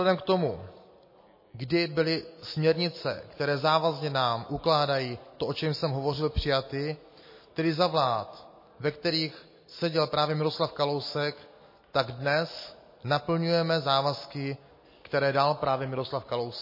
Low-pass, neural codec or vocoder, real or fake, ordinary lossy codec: 5.4 kHz; none; real; MP3, 24 kbps